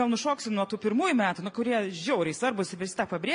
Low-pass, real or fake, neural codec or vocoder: 9.9 kHz; real; none